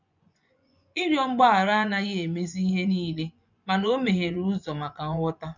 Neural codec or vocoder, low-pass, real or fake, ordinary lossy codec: vocoder, 24 kHz, 100 mel bands, Vocos; 7.2 kHz; fake; none